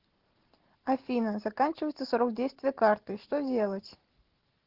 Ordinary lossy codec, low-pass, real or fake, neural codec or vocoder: Opus, 16 kbps; 5.4 kHz; real; none